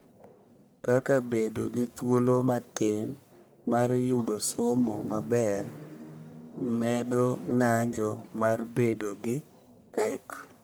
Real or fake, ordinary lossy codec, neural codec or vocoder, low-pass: fake; none; codec, 44.1 kHz, 1.7 kbps, Pupu-Codec; none